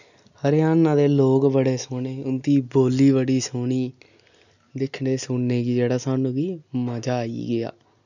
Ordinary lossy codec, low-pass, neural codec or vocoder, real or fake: none; 7.2 kHz; none; real